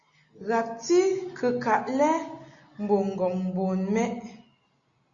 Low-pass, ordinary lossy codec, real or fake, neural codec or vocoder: 7.2 kHz; Opus, 64 kbps; real; none